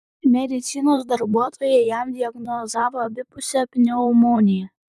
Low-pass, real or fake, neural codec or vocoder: 14.4 kHz; fake; vocoder, 44.1 kHz, 128 mel bands, Pupu-Vocoder